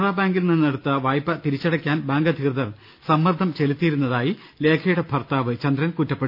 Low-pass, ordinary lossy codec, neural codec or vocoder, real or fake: 5.4 kHz; none; none; real